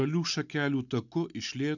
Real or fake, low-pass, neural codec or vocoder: real; 7.2 kHz; none